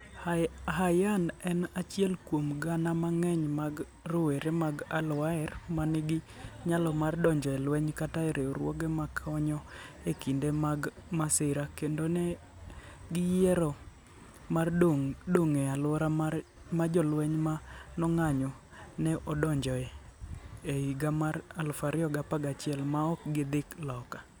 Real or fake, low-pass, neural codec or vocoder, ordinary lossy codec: real; none; none; none